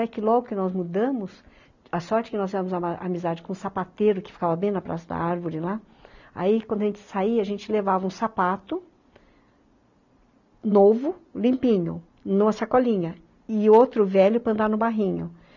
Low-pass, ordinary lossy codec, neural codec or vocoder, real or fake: 7.2 kHz; none; none; real